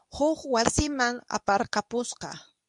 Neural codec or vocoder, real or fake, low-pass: codec, 24 kHz, 0.9 kbps, WavTokenizer, medium speech release version 2; fake; 10.8 kHz